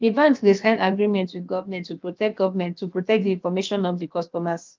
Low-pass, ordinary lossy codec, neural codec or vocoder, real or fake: 7.2 kHz; Opus, 32 kbps; codec, 16 kHz, about 1 kbps, DyCAST, with the encoder's durations; fake